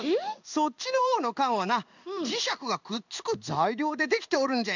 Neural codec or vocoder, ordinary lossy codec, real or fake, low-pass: codec, 16 kHz in and 24 kHz out, 1 kbps, XY-Tokenizer; none; fake; 7.2 kHz